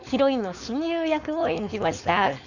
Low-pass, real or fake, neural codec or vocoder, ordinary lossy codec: 7.2 kHz; fake; codec, 16 kHz, 4.8 kbps, FACodec; none